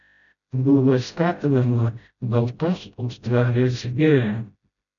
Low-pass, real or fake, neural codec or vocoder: 7.2 kHz; fake; codec, 16 kHz, 0.5 kbps, FreqCodec, smaller model